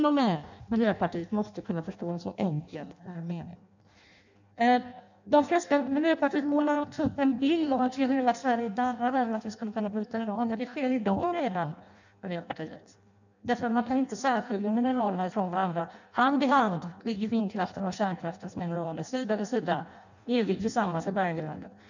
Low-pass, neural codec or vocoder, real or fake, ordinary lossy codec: 7.2 kHz; codec, 16 kHz in and 24 kHz out, 0.6 kbps, FireRedTTS-2 codec; fake; none